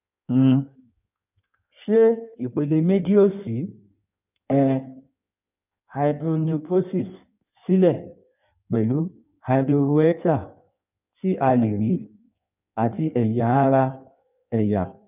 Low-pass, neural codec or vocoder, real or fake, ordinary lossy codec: 3.6 kHz; codec, 16 kHz in and 24 kHz out, 1.1 kbps, FireRedTTS-2 codec; fake; none